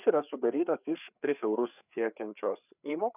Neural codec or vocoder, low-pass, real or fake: codec, 16 kHz, 4 kbps, FreqCodec, larger model; 3.6 kHz; fake